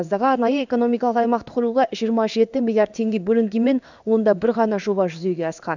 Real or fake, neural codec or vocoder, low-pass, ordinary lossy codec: fake; codec, 16 kHz in and 24 kHz out, 1 kbps, XY-Tokenizer; 7.2 kHz; none